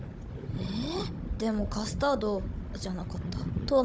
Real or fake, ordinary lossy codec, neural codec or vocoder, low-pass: fake; none; codec, 16 kHz, 16 kbps, FunCodec, trained on Chinese and English, 50 frames a second; none